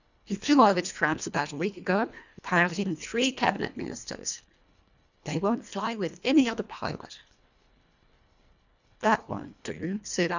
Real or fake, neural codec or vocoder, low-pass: fake; codec, 24 kHz, 1.5 kbps, HILCodec; 7.2 kHz